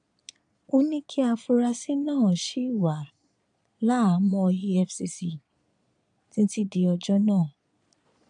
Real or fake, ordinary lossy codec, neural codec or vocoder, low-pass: fake; none; vocoder, 22.05 kHz, 80 mel bands, Vocos; 9.9 kHz